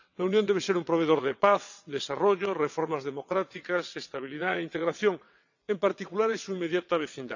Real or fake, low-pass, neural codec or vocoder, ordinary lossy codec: fake; 7.2 kHz; vocoder, 22.05 kHz, 80 mel bands, WaveNeXt; none